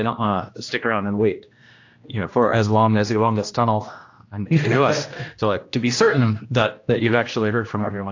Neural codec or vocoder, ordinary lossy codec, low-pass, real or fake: codec, 16 kHz, 1 kbps, X-Codec, HuBERT features, trained on general audio; AAC, 48 kbps; 7.2 kHz; fake